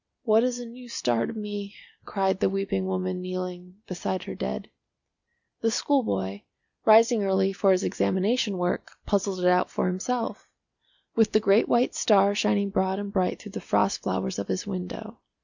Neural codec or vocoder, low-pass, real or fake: none; 7.2 kHz; real